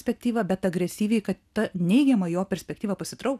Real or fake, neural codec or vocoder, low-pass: real; none; 14.4 kHz